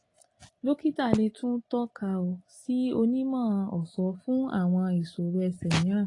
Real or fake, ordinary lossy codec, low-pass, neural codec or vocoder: real; AAC, 32 kbps; 10.8 kHz; none